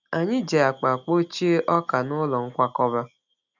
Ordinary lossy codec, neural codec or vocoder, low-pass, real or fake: none; none; 7.2 kHz; real